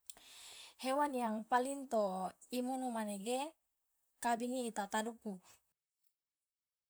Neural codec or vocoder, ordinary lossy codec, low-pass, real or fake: vocoder, 44.1 kHz, 128 mel bands, Pupu-Vocoder; none; none; fake